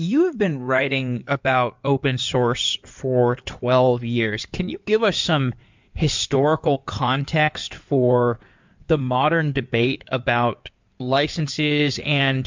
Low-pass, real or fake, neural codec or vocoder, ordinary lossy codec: 7.2 kHz; fake; codec, 16 kHz in and 24 kHz out, 2.2 kbps, FireRedTTS-2 codec; MP3, 64 kbps